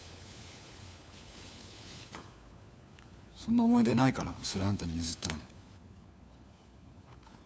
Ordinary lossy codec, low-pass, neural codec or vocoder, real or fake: none; none; codec, 16 kHz, 4 kbps, FunCodec, trained on LibriTTS, 50 frames a second; fake